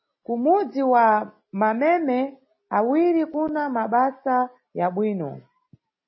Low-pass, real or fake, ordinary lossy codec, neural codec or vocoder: 7.2 kHz; real; MP3, 24 kbps; none